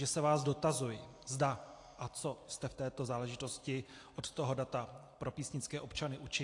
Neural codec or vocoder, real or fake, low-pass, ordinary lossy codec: none; real; 10.8 kHz; AAC, 48 kbps